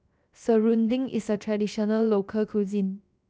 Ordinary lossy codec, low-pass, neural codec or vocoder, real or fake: none; none; codec, 16 kHz, 0.7 kbps, FocalCodec; fake